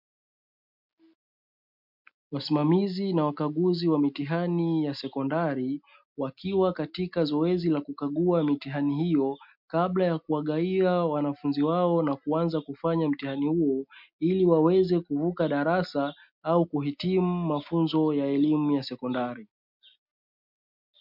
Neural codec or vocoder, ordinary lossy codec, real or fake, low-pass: none; MP3, 48 kbps; real; 5.4 kHz